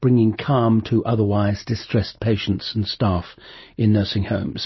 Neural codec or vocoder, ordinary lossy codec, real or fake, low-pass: none; MP3, 24 kbps; real; 7.2 kHz